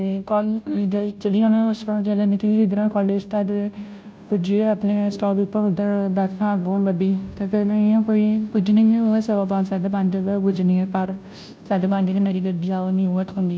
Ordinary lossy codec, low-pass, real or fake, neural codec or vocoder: none; none; fake; codec, 16 kHz, 0.5 kbps, FunCodec, trained on Chinese and English, 25 frames a second